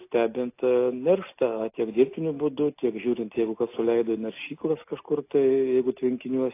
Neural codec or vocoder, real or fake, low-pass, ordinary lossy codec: none; real; 3.6 kHz; AAC, 24 kbps